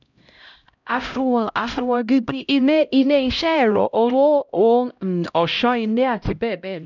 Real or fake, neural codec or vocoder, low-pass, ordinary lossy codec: fake; codec, 16 kHz, 0.5 kbps, X-Codec, HuBERT features, trained on LibriSpeech; 7.2 kHz; none